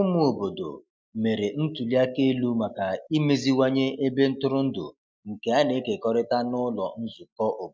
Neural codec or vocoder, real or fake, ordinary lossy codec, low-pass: none; real; none; none